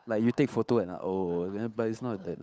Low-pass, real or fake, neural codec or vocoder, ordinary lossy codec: none; fake; codec, 16 kHz, 8 kbps, FunCodec, trained on Chinese and English, 25 frames a second; none